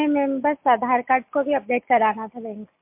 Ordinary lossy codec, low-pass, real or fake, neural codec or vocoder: MP3, 32 kbps; 3.6 kHz; real; none